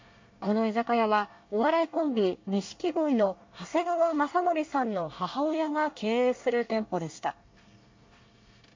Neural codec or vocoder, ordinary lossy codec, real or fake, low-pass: codec, 24 kHz, 1 kbps, SNAC; MP3, 48 kbps; fake; 7.2 kHz